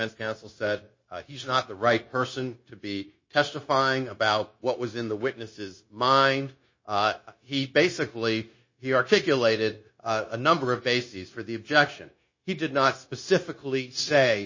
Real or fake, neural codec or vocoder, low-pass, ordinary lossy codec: fake; codec, 16 kHz, 0.9 kbps, LongCat-Audio-Codec; 7.2 kHz; MP3, 32 kbps